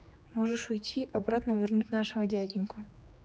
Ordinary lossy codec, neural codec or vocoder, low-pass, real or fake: none; codec, 16 kHz, 2 kbps, X-Codec, HuBERT features, trained on general audio; none; fake